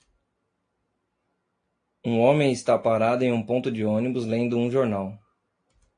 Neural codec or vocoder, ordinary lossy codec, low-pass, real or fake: none; AAC, 48 kbps; 9.9 kHz; real